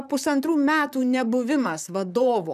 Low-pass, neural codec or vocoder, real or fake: 14.4 kHz; vocoder, 44.1 kHz, 128 mel bands, Pupu-Vocoder; fake